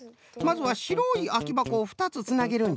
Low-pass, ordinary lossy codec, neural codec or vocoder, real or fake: none; none; none; real